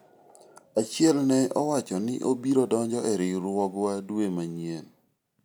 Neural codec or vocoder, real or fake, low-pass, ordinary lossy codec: none; real; none; none